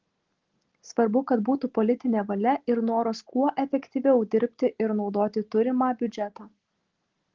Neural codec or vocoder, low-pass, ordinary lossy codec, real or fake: none; 7.2 kHz; Opus, 16 kbps; real